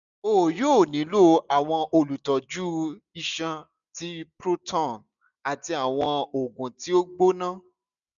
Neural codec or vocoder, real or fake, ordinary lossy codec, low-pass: none; real; none; 7.2 kHz